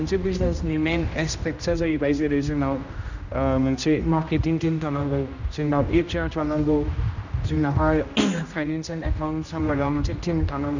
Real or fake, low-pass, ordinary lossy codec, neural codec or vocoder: fake; 7.2 kHz; none; codec, 16 kHz, 1 kbps, X-Codec, HuBERT features, trained on general audio